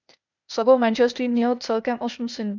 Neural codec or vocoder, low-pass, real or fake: codec, 16 kHz, 0.8 kbps, ZipCodec; 7.2 kHz; fake